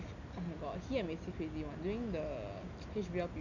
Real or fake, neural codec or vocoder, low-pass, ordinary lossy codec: real; none; 7.2 kHz; none